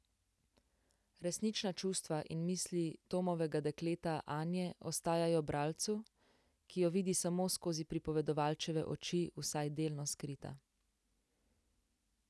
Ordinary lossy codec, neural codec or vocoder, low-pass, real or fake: none; none; none; real